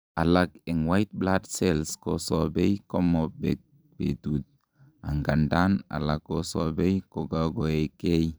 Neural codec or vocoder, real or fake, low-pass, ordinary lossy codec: vocoder, 44.1 kHz, 128 mel bands every 256 samples, BigVGAN v2; fake; none; none